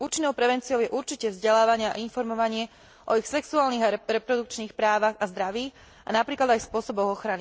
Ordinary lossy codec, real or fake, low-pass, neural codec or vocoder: none; real; none; none